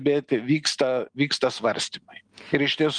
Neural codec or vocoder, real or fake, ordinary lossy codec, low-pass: none; real; Opus, 32 kbps; 9.9 kHz